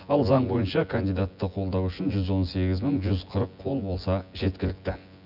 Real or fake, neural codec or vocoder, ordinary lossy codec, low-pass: fake; vocoder, 24 kHz, 100 mel bands, Vocos; none; 5.4 kHz